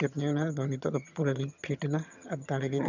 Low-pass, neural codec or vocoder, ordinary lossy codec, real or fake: 7.2 kHz; vocoder, 22.05 kHz, 80 mel bands, HiFi-GAN; none; fake